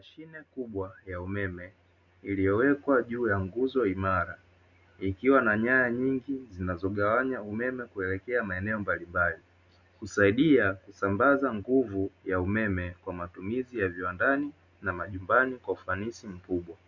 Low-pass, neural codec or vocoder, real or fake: 7.2 kHz; none; real